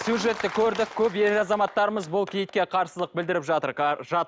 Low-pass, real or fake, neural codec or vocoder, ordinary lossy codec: none; real; none; none